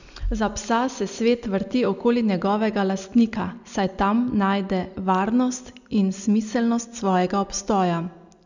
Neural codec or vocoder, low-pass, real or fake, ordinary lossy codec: none; 7.2 kHz; real; none